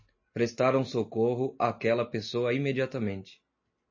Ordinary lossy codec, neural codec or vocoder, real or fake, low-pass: MP3, 32 kbps; none; real; 7.2 kHz